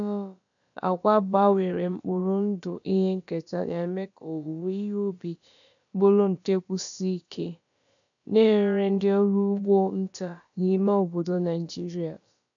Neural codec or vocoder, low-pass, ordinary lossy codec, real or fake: codec, 16 kHz, about 1 kbps, DyCAST, with the encoder's durations; 7.2 kHz; none; fake